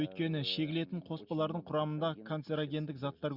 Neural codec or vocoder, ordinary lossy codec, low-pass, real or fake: none; Opus, 64 kbps; 5.4 kHz; real